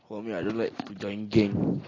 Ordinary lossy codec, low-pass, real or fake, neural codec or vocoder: AAC, 32 kbps; 7.2 kHz; real; none